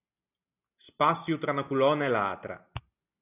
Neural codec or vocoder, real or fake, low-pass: none; real; 3.6 kHz